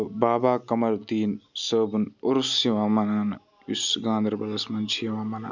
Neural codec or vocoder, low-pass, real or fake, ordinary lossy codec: none; 7.2 kHz; real; none